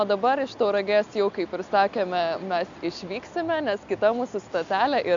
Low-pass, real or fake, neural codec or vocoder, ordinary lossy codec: 7.2 kHz; real; none; AAC, 64 kbps